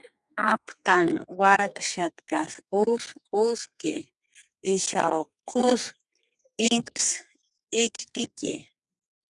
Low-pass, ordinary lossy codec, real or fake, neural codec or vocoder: 10.8 kHz; Opus, 64 kbps; fake; codec, 32 kHz, 1.9 kbps, SNAC